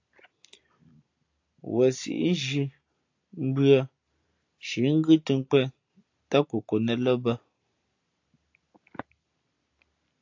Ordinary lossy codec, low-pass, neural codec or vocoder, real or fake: AAC, 48 kbps; 7.2 kHz; none; real